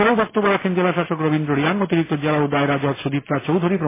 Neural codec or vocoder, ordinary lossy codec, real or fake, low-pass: none; MP3, 16 kbps; real; 3.6 kHz